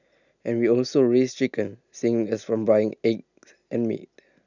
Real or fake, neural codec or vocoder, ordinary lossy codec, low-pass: real; none; none; 7.2 kHz